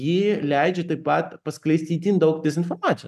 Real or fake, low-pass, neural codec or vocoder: real; 14.4 kHz; none